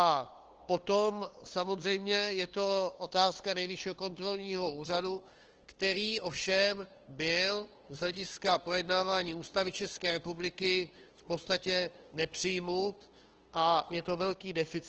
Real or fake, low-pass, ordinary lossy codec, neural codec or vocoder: fake; 7.2 kHz; Opus, 16 kbps; codec, 16 kHz, 2 kbps, FunCodec, trained on LibriTTS, 25 frames a second